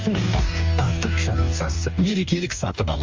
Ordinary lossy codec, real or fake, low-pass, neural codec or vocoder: Opus, 32 kbps; fake; 7.2 kHz; codec, 44.1 kHz, 2.6 kbps, DAC